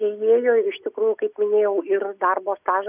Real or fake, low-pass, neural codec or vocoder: real; 3.6 kHz; none